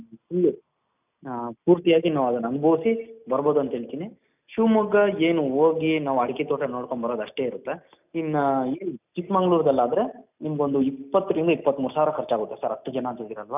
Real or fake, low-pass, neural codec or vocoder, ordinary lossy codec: real; 3.6 kHz; none; none